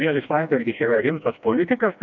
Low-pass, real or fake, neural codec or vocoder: 7.2 kHz; fake; codec, 16 kHz, 1 kbps, FreqCodec, smaller model